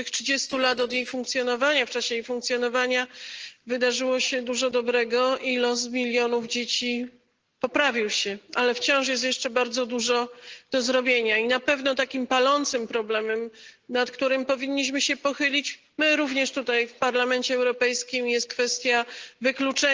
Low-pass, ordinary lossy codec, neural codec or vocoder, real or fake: 7.2 kHz; Opus, 16 kbps; none; real